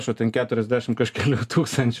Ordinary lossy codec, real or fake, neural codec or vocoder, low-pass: MP3, 96 kbps; real; none; 14.4 kHz